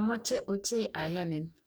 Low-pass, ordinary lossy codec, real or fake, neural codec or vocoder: none; none; fake; codec, 44.1 kHz, 2.6 kbps, DAC